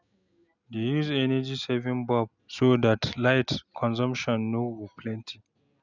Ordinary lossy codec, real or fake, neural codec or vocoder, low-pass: none; real; none; 7.2 kHz